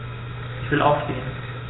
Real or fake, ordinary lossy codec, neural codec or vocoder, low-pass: real; AAC, 16 kbps; none; 7.2 kHz